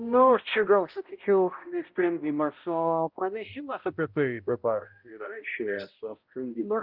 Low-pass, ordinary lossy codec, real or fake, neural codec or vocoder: 5.4 kHz; Opus, 24 kbps; fake; codec, 16 kHz, 0.5 kbps, X-Codec, HuBERT features, trained on balanced general audio